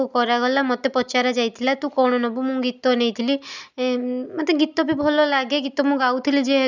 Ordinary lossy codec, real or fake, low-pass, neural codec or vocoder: none; real; 7.2 kHz; none